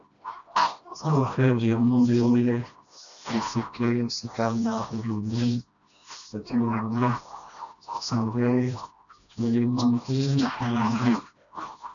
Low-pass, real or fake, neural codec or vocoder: 7.2 kHz; fake; codec, 16 kHz, 1 kbps, FreqCodec, smaller model